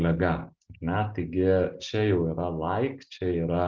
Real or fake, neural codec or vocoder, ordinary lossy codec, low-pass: real; none; Opus, 24 kbps; 7.2 kHz